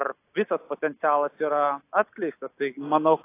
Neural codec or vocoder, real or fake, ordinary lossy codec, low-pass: none; real; AAC, 24 kbps; 3.6 kHz